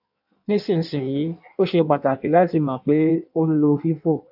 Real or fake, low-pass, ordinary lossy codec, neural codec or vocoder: fake; 5.4 kHz; AAC, 48 kbps; codec, 16 kHz in and 24 kHz out, 1.1 kbps, FireRedTTS-2 codec